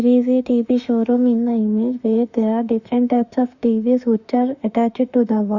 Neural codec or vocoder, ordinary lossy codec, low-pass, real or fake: autoencoder, 48 kHz, 32 numbers a frame, DAC-VAE, trained on Japanese speech; Opus, 64 kbps; 7.2 kHz; fake